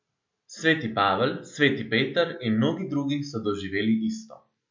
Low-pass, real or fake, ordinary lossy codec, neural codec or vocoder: 7.2 kHz; real; MP3, 64 kbps; none